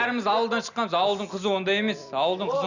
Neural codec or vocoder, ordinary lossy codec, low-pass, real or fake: none; none; 7.2 kHz; real